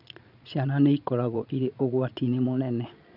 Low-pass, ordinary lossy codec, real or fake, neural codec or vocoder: 5.4 kHz; none; fake; vocoder, 22.05 kHz, 80 mel bands, Vocos